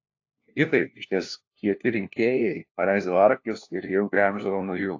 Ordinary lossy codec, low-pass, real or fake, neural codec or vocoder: AAC, 48 kbps; 7.2 kHz; fake; codec, 16 kHz, 1 kbps, FunCodec, trained on LibriTTS, 50 frames a second